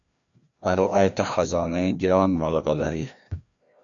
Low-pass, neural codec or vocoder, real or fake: 7.2 kHz; codec, 16 kHz, 1 kbps, FreqCodec, larger model; fake